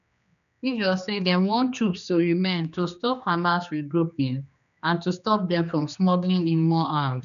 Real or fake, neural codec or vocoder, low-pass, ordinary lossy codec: fake; codec, 16 kHz, 2 kbps, X-Codec, HuBERT features, trained on general audio; 7.2 kHz; none